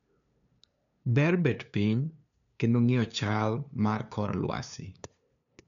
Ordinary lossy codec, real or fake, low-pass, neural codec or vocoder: none; fake; 7.2 kHz; codec, 16 kHz, 2 kbps, FunCodec, trained on LibriTTS, 25 frames a second